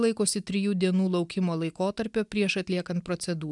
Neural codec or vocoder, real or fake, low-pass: none; real; 10.8 kHz